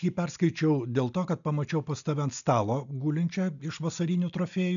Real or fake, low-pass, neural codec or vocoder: real; 7.2 kHz; none